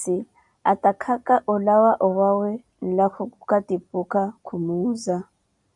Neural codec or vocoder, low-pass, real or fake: none; 10.8 kHz; real